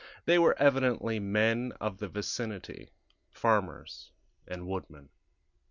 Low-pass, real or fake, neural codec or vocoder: 7.2 kHz; real; none